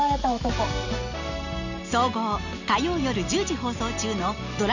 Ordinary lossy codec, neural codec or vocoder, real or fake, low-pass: none; none; real; 7.2 kHz